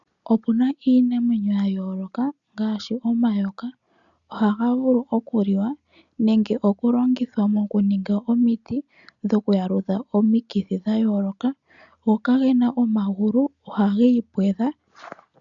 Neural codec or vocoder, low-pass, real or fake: none; 7.2 kHz; real